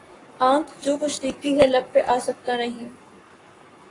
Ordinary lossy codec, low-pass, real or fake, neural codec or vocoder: AAC, 32 kbps; 10.8 kHz; fake; codec, 44.1 kHz, 7.8 kbps, Pupu-Codec